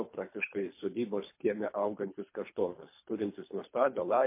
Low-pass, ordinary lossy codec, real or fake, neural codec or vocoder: 3.6 kHz; MP3, 24 kbps; fake; codec, 24 kHz, 3 kbps, HILCodec